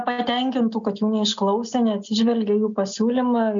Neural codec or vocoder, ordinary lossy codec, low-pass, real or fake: none; MP3, 64 kbps; 7.2 kHz; real